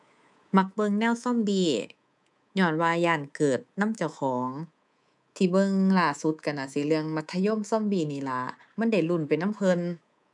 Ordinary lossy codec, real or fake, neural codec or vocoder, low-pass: none; fake; codec, 24 kHz, 3.1 kbps, DualCodec; 10.8 kHz